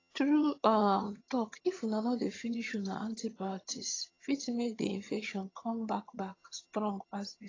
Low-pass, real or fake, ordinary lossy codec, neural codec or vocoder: 7.2 kHz; fake; AAC, 32 kbps; vocoder, 22.05 kHz, 80 mel bands, HiFi-GAN